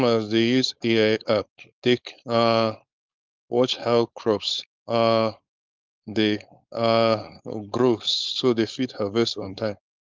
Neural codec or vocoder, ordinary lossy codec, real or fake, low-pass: codec, 16 kHz, 4.8 kbps, FACodec; Opus, 24 kbps; fake; 7.2 kHz